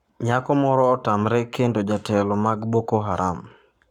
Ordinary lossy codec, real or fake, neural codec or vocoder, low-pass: none; fake; codec, 44.1 kHz, 7.8 kbps, Pupu-Codec; 19.8 kHz